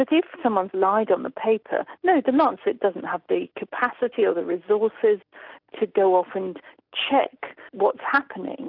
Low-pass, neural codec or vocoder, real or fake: 5.4 kHz; none; real